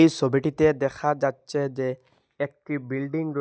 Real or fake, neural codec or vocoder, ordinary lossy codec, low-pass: real; none; none; none